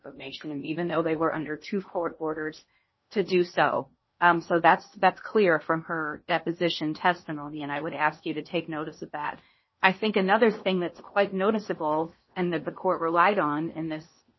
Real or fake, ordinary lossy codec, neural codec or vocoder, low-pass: fake; MP3, 24 kbps; codec, 16 kHz in and 24 kHz out, 0.8 kbps, FocalCodec, streaming, 65536 codes; 7.2 kHz